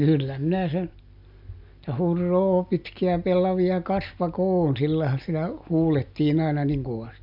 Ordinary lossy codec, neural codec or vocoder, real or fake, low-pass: MP3, 48 kbps; none; real; 5.4 kHz